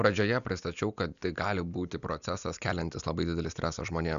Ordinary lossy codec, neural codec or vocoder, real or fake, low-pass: MP3, 96 kbps; none; real; 7.2 kHz